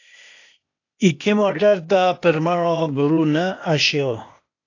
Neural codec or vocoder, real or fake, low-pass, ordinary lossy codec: codec, 16 kHz, 0.8 kbps, ZipCodec; fake; 7.2 kHz; AAC, 48 kbps